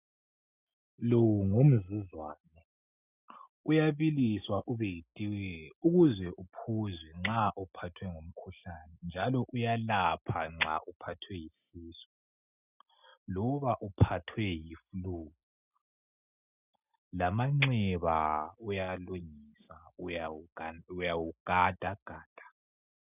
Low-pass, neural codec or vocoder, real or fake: 3.6 kHz; none; real